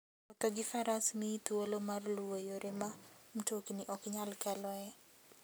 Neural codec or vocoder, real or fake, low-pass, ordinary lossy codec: none; real; none; none